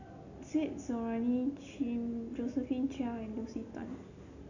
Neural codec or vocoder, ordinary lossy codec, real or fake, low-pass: none; none; real; 7.2 kHz